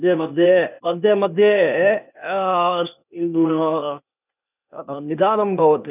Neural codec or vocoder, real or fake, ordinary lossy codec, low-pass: codec, 16 kHz, 0.8 kbps, ZipCodec; fake; none; 3.6 kHz